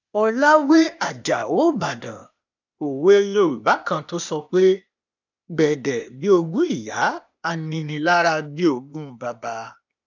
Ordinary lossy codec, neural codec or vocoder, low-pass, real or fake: none; codec, 16 kHz, 0.8 kbps, ZipCodec; 7.2 kHz; fake